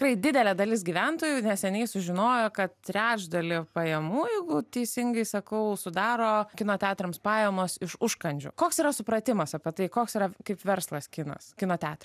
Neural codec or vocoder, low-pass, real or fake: none; 14.4 kHz; real